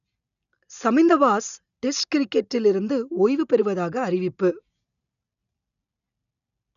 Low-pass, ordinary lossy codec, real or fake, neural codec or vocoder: 7.2 kHz; none; real; none